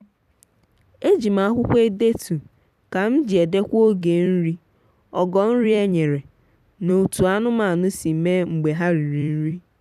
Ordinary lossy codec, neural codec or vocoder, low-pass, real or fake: none; vocoder, 44.1 kHz, 128 mel bands every 256 samples, BigVGAN v2; 14.4 kHz; fake